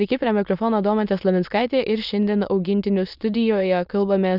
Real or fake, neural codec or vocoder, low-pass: fake; autoencoder, 22.05 kHz, a latent of 192 numbers a frame, VITS, trained on many speakers; 5.4 kHz